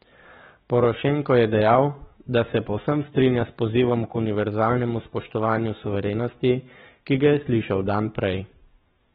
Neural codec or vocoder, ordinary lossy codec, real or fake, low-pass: codec, 44.1 kHz, 7.8 kbps, DAC; AAC, 16 kbps; fake; 19.8 kHz